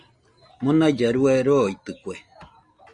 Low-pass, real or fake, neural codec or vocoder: 9.9 kHz; real; none